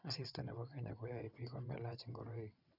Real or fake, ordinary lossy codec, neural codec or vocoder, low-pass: fake; none; vocoder, 22.05 kHz, 80 mel bands, WaveNeXt; 5.4 kHz